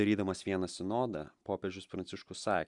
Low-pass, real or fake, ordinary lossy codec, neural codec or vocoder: 10.8 kHz; real; Opus, 64 kbps; none